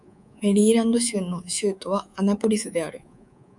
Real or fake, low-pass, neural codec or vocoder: fake; 10.8 kHz; codec, 24 kHz, 3.1 kbps, DualCodec